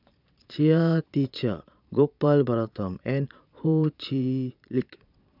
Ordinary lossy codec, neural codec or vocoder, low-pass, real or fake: none; vocoder, 22.05 kHz, 80 mel bands, Vocos; 5.4 kHz; fake